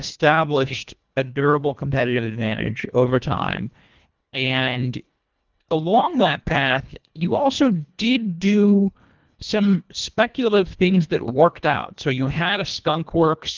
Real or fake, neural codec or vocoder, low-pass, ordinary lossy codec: fake; codec, 24 kHz, 1.5 kbps, HILCodec; 7.2 kHz; Opus, 24 kbps